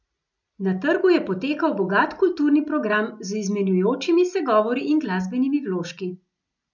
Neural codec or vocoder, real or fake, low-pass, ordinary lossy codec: none; real; 7.2 kHz; none